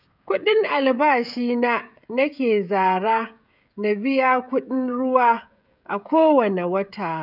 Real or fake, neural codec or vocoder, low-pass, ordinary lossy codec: fake; codec, 16 kHz, 16 kbps, FreqCodec, smaller model; 5.4 kHz; none